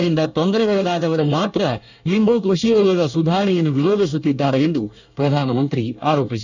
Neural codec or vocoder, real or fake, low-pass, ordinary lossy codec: codec, 24 kHz, 1 kbps, SNAC; fake; 7.2 kHz; none